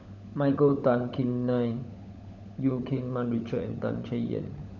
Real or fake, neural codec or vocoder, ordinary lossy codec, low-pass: fake; codec, 16 kHz, 16 kbps, FunCodec, trained on LibriTTS, 50 frames a second; none; 7.2 kHz